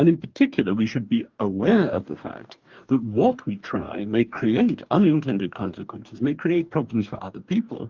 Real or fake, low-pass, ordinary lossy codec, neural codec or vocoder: fake; 7.2 kHz; Opus, 24 kbps; codec, 44.1 kHz, 2.6 kbps, DAC